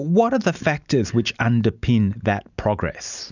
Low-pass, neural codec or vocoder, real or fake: 7.2 kHz; none; real